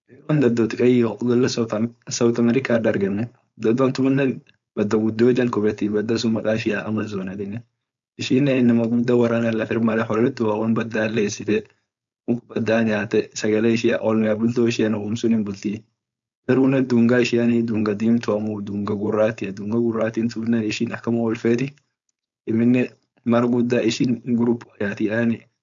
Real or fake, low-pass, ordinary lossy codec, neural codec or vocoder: fake; 7.2 kHz; MP3, 64 kbps; codec, 16 kHz, 4.8 kbps, FACodec